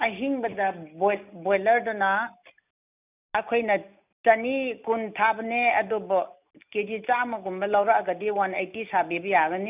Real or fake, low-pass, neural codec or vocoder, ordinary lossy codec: real; 3.6 kHz; none; none